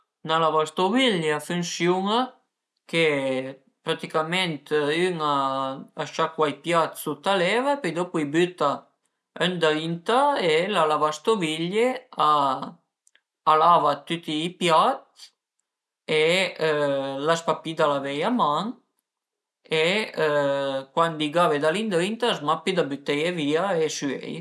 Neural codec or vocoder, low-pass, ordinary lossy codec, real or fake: none; none; none; real